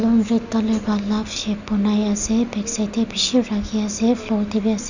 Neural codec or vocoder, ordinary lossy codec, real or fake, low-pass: none; none; real; 7.2 kHz